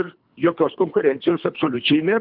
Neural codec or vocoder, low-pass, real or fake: codec, 24 kHz, 3 kbps, HILCodec; 5.4 kHz; fake